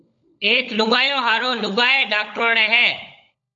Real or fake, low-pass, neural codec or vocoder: fake; 7.2 kHz; codec, 16 kHz, 16 kbps, FunCodec, trained on LibriTTS, 50 frames a second